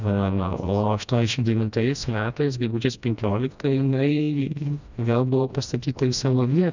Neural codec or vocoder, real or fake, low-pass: codec, 16 kHz, 1 kbps, FreqCodec, smaller model; fake; 7.2 kHz